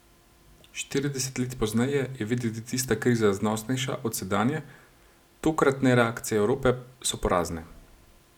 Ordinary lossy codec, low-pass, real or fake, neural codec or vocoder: none; 19.8 kHz; real; none